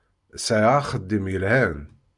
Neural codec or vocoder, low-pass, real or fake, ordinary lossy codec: none; 10.8 kHz; real; MP3, 96 kbps